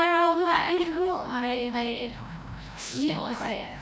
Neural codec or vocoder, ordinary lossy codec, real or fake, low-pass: codec, 16 kHz, 0.5 kbps, FreqCodec, larger model; none; fake; none